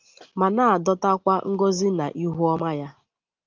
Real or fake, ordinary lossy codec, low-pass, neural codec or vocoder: real; Opus, 32 kbps; 7.2 kHz; none